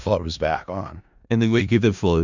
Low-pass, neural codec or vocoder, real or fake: 7.2 kHz; codec, 16 kHz in and 24 kHz out, 0.4 kbps, LongCat-Audio-Codec, four codebook decoder; fake